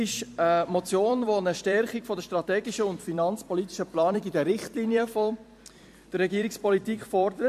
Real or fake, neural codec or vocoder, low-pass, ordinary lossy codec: fake; vocoder, 44.1 kHz, 128 mel bands every 256 samples, BigVGAN v2; 14.4 kHz; MP3, 64 kbps